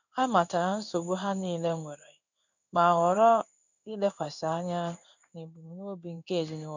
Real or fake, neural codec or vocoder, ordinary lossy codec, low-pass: fake; codec, 16 kHz in and 24 kHz out, 1 kbps, XY-Tokenizer; none; 7.2 kHz